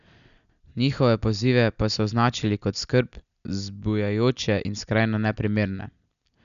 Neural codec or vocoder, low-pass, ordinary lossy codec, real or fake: none; 7.2 kHz; none; real